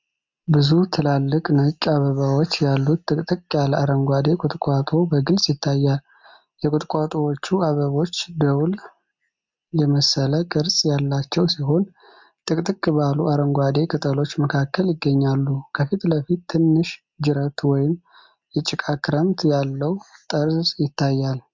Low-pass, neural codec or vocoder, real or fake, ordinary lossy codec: 7.2 kHz; none; real; MP3, 64 kbps